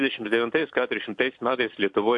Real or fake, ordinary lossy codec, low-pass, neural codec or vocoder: fake; AAC, 64 kbps; 10.8 kHz; vocoder, 44.1 kHz, 128 mel bands every 256 samples, BigVGAN v2